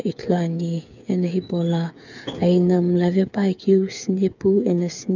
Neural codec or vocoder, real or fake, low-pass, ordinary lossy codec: codec, 16 kHz, 8 kbps, FreqCodec, smaller model; fake; 7.2 kHz; none